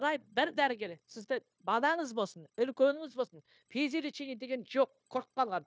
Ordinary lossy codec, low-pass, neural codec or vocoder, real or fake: none; none; codec, 16 kHz, 0.9 kbps, LongCat-Audio-Codec; fake